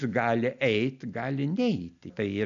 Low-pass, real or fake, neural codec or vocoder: 7.2 kHz; real; none